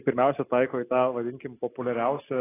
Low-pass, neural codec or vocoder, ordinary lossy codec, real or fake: 3.6 kHz; none; AAC, 16 kbps; real